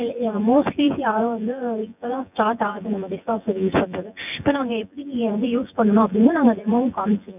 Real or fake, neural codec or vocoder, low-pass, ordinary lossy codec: fake; vocoder, 24 kHz, 100 mel bands, Vocos; 3.6 kHz; none